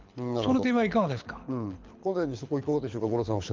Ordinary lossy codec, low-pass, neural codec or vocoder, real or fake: Opus, 32 kbps; 7.2 kHz; codec, 24 kHz, 6 kbps, HILCodec; fake